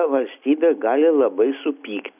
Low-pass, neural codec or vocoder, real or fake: 3.6 kHz; none; real